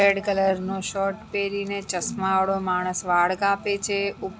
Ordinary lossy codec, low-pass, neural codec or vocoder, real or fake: none; none; none; real